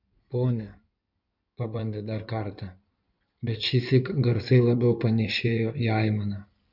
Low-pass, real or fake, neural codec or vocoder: 5.4 kHz; fake; codec, 16 kHz in and 24 kHz out, 2.2 kbps, FireRedTTS-2 codec